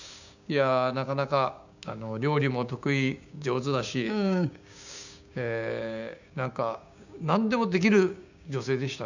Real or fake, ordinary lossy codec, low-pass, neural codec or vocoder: fake; none; 7.2 kHz; codec, 16 kHz, 6 kbps, DAC